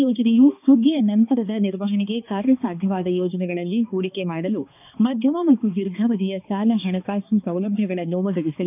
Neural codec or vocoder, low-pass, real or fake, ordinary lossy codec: codec, 16 kHz, 2 kbps, X-Codec, HuBERT features, trained on balanced general audio; 3.6 kHz; fake; none